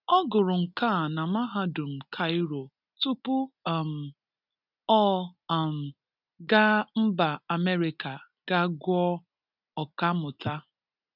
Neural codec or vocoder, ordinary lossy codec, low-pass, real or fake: none; none; 5.4 kHz; real